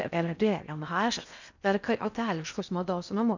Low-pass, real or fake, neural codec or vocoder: 7.2 kHz; fake; codec, 16 kHz in and 24 kHz out, 0.6 kbps, FocalCodec, streaming, 4096 codes